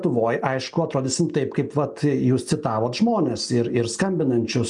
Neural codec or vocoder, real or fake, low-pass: none; real; 10.8 kHz